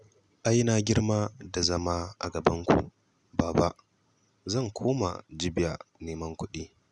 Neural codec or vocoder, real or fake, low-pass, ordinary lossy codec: none; real; 10.8 kHz; none